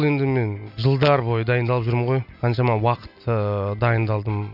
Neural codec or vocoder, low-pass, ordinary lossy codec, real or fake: none; 5.4 kHz; none; real